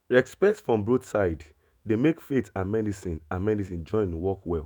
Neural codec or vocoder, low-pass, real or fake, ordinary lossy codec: autoencoder, 48 kHz, 128 numbers a frame, DAC-VAE, trained on Japanese speech; none; fake; none